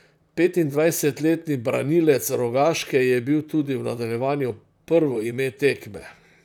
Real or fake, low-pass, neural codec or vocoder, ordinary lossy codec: fake; 19.8 kHz; vocoder, 44.1 kHz, 128 mel bands, Pupu-Vocoder; none